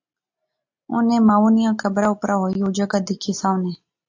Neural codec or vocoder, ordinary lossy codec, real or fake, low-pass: none; AAC, 48 kbps; real; 7.2 kHz